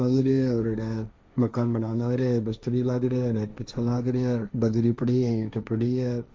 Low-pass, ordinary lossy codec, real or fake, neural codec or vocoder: none; none; fake; codec, 16 kHz, 1.1 kbps, Voila-Tokenizer